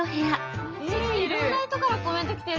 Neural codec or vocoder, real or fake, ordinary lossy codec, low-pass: none; real; Opus, 24 kbps; 7.2 kHz